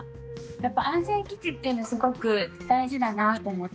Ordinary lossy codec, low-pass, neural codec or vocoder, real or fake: none; none; codec, 16 kHz, 2 kbps, X-Codec, HuBERT features, trained on balanced general audio; fake